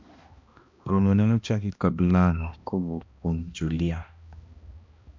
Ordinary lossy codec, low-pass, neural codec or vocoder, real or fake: MP3, 64 kbps; 7.2 kHz; codec, 16 kHz, 1 kbps, X-Codec, HuBERT features, trained on balanced general audio; fake